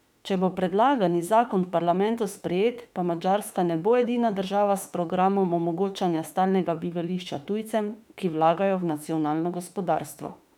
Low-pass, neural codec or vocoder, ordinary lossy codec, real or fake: 19.8 kHz; autoencoder, 48 kHz, 32 numbers a frame, DAC-VAE, trained on Japanese speech; none; fake